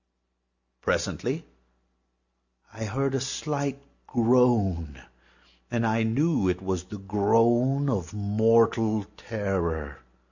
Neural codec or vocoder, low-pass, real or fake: none; 7.2 kHz; real